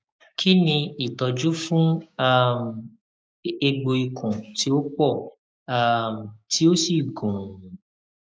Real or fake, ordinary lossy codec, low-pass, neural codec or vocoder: fake; none; none; codec, 16 kHz, 6 kbps, DAC